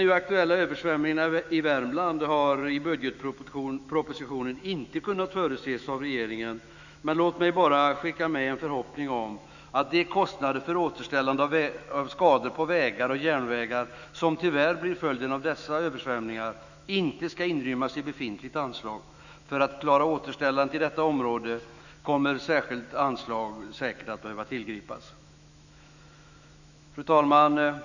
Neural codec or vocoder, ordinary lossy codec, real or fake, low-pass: autoencoder, 48 kHz, 128 numbers a frame, DAC-VAE, trained on Japanese speech; none; fake; 7.2 kHz